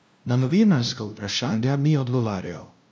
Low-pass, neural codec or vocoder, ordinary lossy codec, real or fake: none; codec, 16 kHz, 0.5 kbps, FunCodec, trained on LibriTTS, 25 frames a second; none; fake